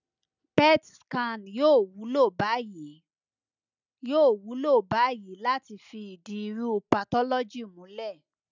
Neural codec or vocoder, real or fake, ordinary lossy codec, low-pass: none; real; none; 7.2 kHz